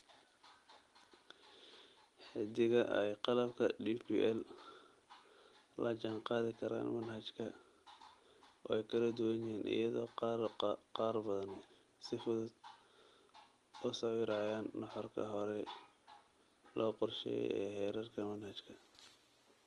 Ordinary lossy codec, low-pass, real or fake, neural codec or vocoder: Opus, 24 kbps; 10.8 kHz; real; none